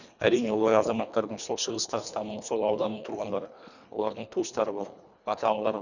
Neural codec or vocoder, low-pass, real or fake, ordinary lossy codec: codec, 24 kHz, 1.5 kbps, HILCodec; 7.2 kHz; fake; none